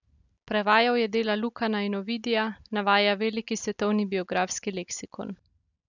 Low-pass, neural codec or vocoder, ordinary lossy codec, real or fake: 7.2 kHz; none; none; real